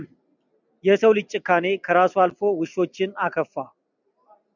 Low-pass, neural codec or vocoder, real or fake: 7.2 kHz; none; real